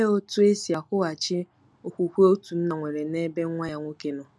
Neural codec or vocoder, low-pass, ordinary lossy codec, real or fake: none; none; none; real